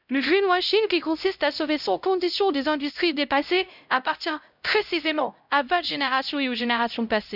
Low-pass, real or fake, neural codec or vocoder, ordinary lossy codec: 5.4 kHz; fake; codec, 16 kHz, 0.5 kbps, X-Codec, HuBERT features, trained on LibriSpeech; none